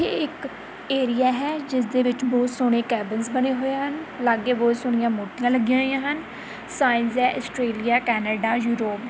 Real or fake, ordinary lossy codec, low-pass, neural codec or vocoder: real; none; none; none